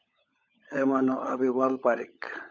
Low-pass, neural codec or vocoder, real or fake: 7.2 kHz; codec, 16 kHz, 16 kbps, FunCodec, trained on LibriTTS, 50 frames a second; fake